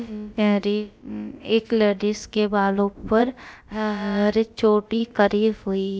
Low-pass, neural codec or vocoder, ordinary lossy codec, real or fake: none; codec, 16 kHz, about 1 kbps, DyCAST, with the encoder's durations; none; fake